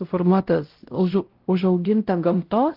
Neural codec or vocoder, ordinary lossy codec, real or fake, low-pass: codec, 16 kHz, 0.5 kbps, X-Codec, WavLM features, trained on Multilingual LibriSpeech; Opus, 16 kbps; fake; 5.4 kHz